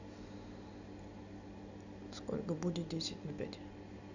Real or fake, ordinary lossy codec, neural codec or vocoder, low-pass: real; none; none; 7.2 kHz